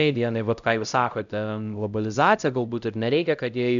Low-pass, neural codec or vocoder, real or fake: 7.2 kHz; codec, 16 kHz, 0.5 kbps, X-Codec, HuBERT features, trained on LibriSpeech; fake